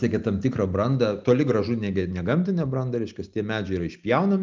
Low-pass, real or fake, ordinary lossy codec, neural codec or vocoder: 7.2 kHz; real; Opus, 24 kbps; none